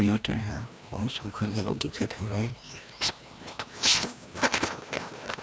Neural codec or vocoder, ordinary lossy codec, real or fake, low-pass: codec, 16 kHz, 1 kbps, FreqCodec, larger model; none; fake; none